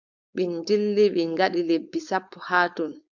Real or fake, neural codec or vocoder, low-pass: fake; codec, 16 kHz, 4.8 kbps, FACodec; 7.2 kHz